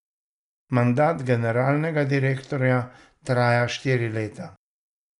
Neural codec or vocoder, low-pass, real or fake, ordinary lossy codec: none; 10.8 kHz; real; none